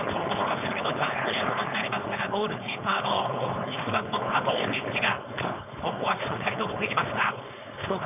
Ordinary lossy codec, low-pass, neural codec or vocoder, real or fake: none; 3.6 kHz; codec, 16 kHz, 4.8 kbps, FACodec; fake